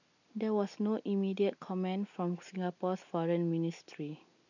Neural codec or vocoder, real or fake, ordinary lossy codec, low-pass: none; real; none; 7.2 kHz